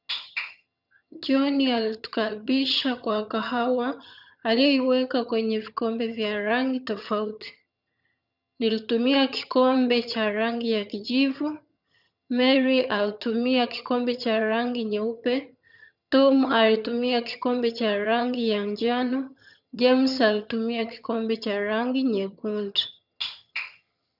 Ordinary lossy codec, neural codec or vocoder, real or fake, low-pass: none; vocoder, 22.05 kHz, 80 mel bands, HiFi-GAN; fake; 5.4 kHz